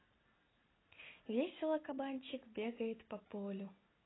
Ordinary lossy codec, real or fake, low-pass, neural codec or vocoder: AAC, 16 kbps; real; 7.2 kHz; none